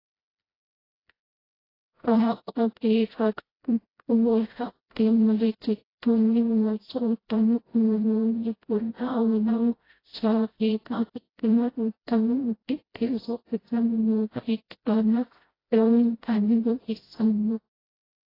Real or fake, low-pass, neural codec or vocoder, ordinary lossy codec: fake; 5.4 kHz; codec, 16 kHz, 0.5 kbps, FreqCodec, smaller model; AAC, 24 kbps